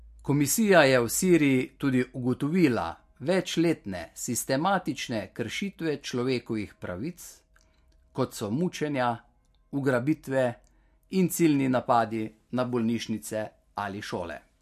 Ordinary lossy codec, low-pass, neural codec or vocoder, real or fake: MP3, 64 kbps; 14.4 kHz; none; real